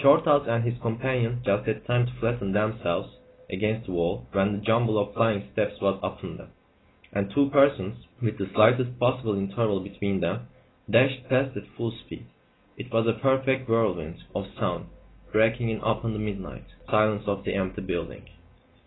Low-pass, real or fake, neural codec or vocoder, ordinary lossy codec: 7.2 kHz; real; none; AAC, 16 kbps